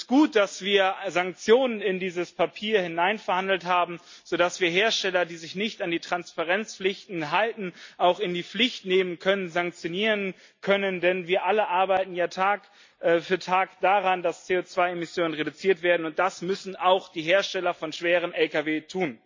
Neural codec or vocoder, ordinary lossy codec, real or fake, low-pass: none; MP3, 64 kbps; real; 7.2 kHz